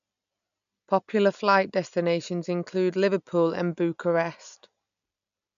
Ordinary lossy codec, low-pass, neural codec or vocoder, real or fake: AAC, 96 kbps; 7.2 kHz; none; real